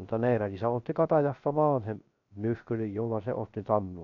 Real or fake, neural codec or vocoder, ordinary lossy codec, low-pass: fake; codec, 16 kHz, 0.3 kbps, FocalCodec; none; 7.2 kHz